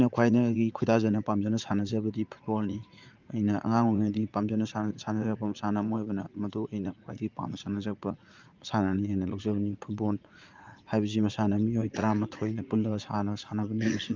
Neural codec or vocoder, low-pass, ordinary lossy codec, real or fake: vocoder, 44.1 kHz, 80 mel bands, Vocos; 7.2 kHz; Opus, 32 kbps; fake